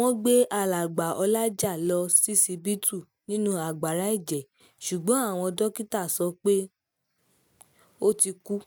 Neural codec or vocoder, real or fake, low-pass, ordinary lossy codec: none; real; none; none